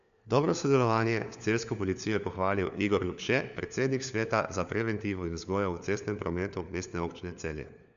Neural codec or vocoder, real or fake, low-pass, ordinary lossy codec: codec, 16 kHz, 4 kbps, FunCodec, trained on LibriTTS, 50 frames a second; fake; 7.2 kHz; none